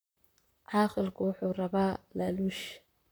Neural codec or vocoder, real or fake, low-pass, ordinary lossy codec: vocoder, 44.1 kHz, 128 mel bands, Pupu-Vocoder; fake; none; none